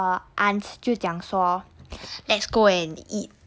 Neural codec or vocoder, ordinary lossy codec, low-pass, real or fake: none; none; none; real